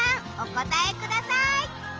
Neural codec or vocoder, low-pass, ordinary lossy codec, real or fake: none; 7.2 kHz; Opus, 24 kbps; real